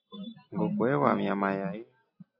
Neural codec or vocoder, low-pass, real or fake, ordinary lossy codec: none; 5.4 kHz; real; AAC, 48 kbps